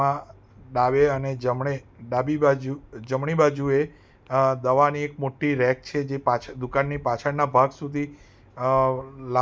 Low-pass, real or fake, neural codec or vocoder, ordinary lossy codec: none; real; none; none